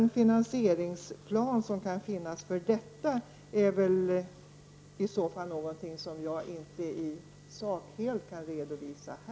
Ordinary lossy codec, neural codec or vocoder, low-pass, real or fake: none; none; none; real